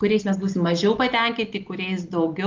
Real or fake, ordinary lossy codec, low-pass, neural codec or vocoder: real; Opus, 32 kbps; 7.2 kHz; none